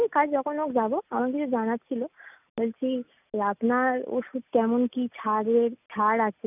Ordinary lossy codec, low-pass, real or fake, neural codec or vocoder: none; 3.6 kHz; real; none